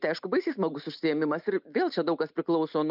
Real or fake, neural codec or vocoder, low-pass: real; none; 5.4 kHz